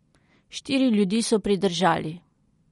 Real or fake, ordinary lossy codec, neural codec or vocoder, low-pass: real; MP3, 48 kbps; none; 19.8 kHz